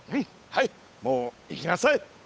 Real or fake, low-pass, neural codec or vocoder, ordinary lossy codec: fake; none; codec, 16 kHz, 8 kbps, FunCodec, trained on Chinese and English, 25 frames a second; none